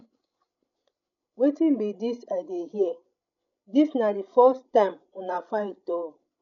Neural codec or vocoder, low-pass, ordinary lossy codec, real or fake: codec, 16 kHz, 16 kbps, FreqCodec, larger model; 7.2 kHz; none; fake